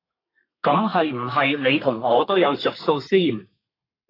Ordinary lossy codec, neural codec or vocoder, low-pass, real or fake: AAC, 24 kbps; codec, 32 kHz, 1.9 kbps, SNAC; 5.4 kHz; fake